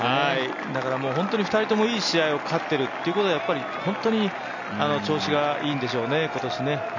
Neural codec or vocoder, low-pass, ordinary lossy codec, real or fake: none; 7.2 kHz; none; real